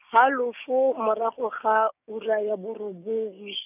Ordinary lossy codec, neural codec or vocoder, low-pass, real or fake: none; codec, 16 kHz, 6 kbps, DAC; 3.6 kHz; fake